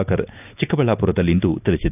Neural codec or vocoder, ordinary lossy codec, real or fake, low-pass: none; none; real; 3.6 kHz